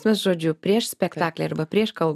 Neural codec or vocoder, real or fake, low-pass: none; real; 14.4 kHz